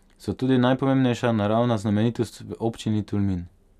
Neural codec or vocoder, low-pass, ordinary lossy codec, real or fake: none; 14.4 kHz; none; real